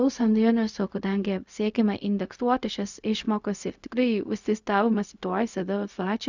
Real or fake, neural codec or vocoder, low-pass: fake; codec, 16 kHz, 0.4 kbps, LongCat-Audio-Codec; 7.2 kHz